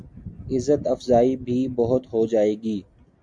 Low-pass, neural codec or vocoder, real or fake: 9.9 kHz; none; real